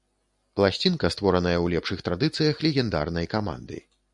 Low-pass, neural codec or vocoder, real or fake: 10.8 kHz; none; real